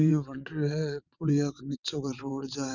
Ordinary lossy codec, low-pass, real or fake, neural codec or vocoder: none; 7.2 kHz; fake; vocoder, 22.05 kHz, 80 mel bands, WaveNeXt